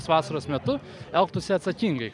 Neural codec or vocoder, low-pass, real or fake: none; 10.8 kHz; real